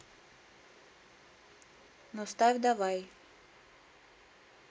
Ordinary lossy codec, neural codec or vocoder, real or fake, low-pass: none; none; real; none